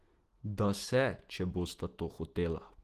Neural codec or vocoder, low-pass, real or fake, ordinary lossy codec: autoencoder, 48 kHz, 128 numbers a frame, DAC-VAE, trained on Japanese speech; 14.4 kHz; fake; Opus, 16 kbps